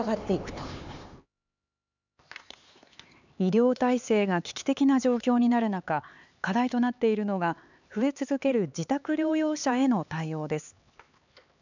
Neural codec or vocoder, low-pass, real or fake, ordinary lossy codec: codec, 16 kHz, 4 kbps, X-Codec, HuBERT features, trained on LibriSpeech; 7.2 kHz; fake; none